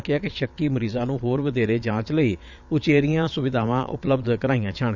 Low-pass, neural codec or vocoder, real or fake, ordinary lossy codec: 7.2 kHz; vocoder, 22.05 kHz, 80 mel bands, Vocos; fake; none